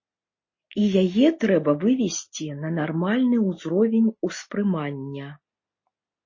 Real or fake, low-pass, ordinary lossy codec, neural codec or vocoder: real; 7.2 kHz; MP3, 32 kbps; none